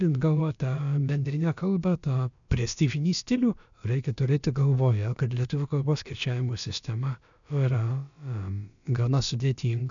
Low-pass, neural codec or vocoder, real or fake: 7.2 kHz; codec, 16 kHz, about 1 kbps, DyCAST, with the encoder's durations; fake